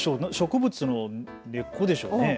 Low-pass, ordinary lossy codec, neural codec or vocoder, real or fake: none; none; none; real